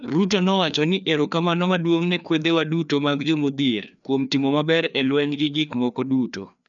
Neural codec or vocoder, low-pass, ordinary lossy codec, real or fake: codec, 16 kHz, 2 kbps, FreqCodec, larger model; 7.2 kHz; none; fake